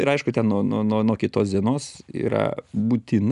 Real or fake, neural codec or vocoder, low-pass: real; none; 10.8 kHz